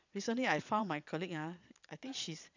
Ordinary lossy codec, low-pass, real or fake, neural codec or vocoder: none; 7.2 kHz; fake; vocoder, 22.05 kHz, 80 mel bands, Vocos